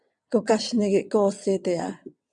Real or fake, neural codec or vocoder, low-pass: fake; vocoder, 22.05 kHz, 80 mel bands, WaveNeXt; 9.9 kHz